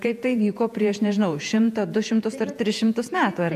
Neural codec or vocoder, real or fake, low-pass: vocoder, 48 kHz, 128 mel bands, Vocos; fake; 14.4 kHz